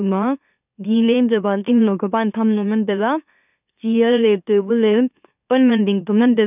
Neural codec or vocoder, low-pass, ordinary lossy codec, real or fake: autoencoder, 44.1 kHz, a latent of 192 numbers a frame, MeloTTS; 3.6 kHz; none; fake